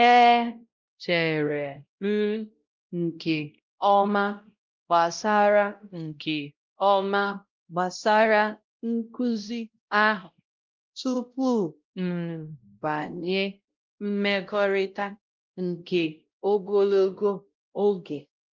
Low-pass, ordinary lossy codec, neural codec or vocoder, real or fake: 7.2 kHz; Opus, 32 kbps; codec, 16 kHz, 0.5 kbps, X-Codec, WavLM features, trained on Multilingual LibriSpeech; fake